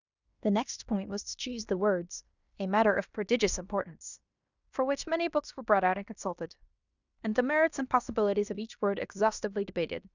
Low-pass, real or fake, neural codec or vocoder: 7.2 kHz; fake; codec, 16 kHz in and 24 kHz out, 0.9 kbps, LongCat-Audio-Codec, fine tuned four codebook decoder